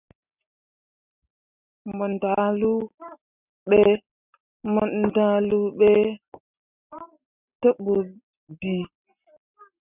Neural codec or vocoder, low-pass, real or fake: none; 3.6 kHz; real